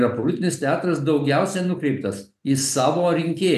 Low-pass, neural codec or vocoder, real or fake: 14.4 kHz; none; real